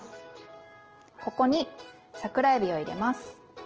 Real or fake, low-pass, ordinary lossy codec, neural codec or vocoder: real; 7.2 kHz; Opus, 16 kbps; none